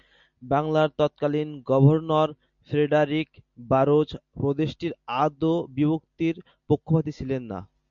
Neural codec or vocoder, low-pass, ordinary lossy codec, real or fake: none; 7.2 kHz; MP3, 96 kbps; real